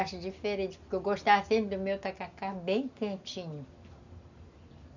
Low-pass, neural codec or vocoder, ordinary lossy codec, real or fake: 7.2 kHz; none; none; real